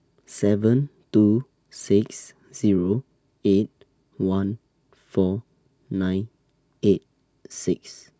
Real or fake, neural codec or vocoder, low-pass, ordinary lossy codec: real; none; none; none